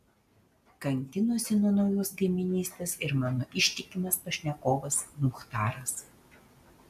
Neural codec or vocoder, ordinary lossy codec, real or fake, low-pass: none; AAC, 96 kbps; real; 14.4 kHz